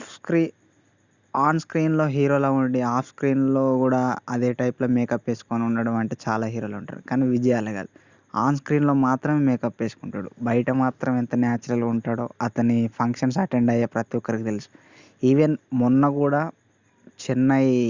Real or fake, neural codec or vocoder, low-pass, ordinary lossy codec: real; none; 7.2 kHz; Opus, 64 kbps